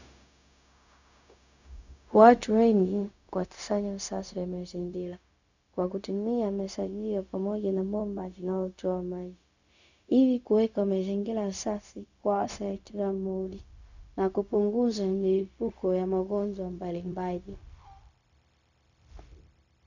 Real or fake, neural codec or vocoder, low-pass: fake; codec, 16 kHz, 0.4 kbps, LongCat-Audio-Codec; 7.2 kHz